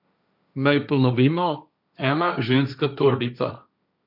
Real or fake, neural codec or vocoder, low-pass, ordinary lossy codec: fake; codec, 16 kHz, 1.1 kbps, Voila-Tokenizer; 5.4 kHz; none